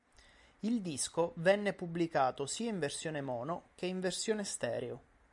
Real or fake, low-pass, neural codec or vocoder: real; 10.8 kHz; none